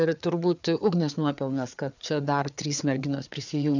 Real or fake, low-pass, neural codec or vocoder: fake; 7.2 kHz; codec, 16 kHz, 4 kbps, FreqCodec, larger model